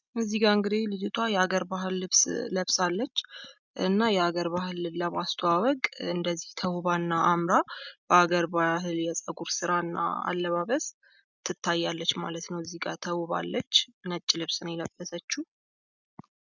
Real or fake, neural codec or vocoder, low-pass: real; none; 7.2 kHz